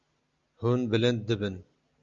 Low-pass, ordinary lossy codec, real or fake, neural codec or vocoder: 7.2 kHz; Opus, 64 kbps; real; none